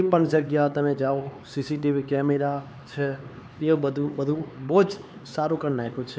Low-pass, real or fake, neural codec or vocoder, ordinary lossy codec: none; fake; codec, 16 kHz, 4 kbps, X-Codec, HuBERT features, trained on LibriSpeech; none